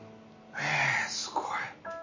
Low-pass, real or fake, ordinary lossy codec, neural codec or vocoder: 7.2 kHz; real; MP3, 32 kbps; none